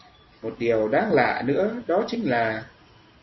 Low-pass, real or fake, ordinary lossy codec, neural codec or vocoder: 7.2 kHz; real; MP3, 24 kbps; none